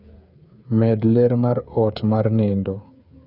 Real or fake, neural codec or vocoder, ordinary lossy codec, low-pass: fake; codec, 16 kHz, 8 kbps, FreqCodec, smaller model; none; 5.4 kHz